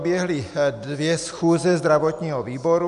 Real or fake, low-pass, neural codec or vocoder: real; 10.8 kHz; none